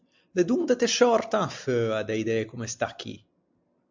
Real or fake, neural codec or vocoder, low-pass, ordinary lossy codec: real; none; 7.2 kHz; MP3, 64 kbps